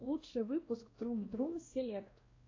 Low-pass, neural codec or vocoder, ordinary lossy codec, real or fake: 7.2 kHz; codec, 16 kHz, 1 kbps, X-Codec, WavLM features, trained on Multilingual LibriSpeech; AAC, 32 kbps; fake